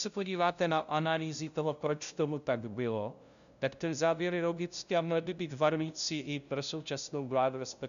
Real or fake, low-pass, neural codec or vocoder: fake; 7.2 kHz; codec, 16 kHz, 0.5 kbps, FunCodec, trained on LibriTTS, 25 frames a second